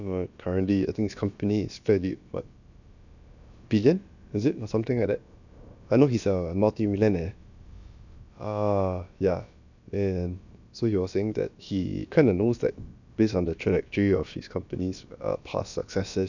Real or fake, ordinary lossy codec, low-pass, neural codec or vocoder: fake; none; 7.2 kHz; codec, 16 kHz, about 1 kbps, DyCAST, with the encoder's durations